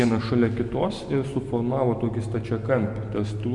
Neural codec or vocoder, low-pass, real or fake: none; 10.8 kHz; real